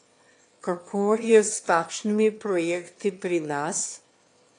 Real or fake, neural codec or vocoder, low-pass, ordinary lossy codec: fake; autoencoder, 22.05 kHz, a latent of 192 numbers a frame, VITS, trained on one speaker; 9.9 kHz; AAC, 48 kbps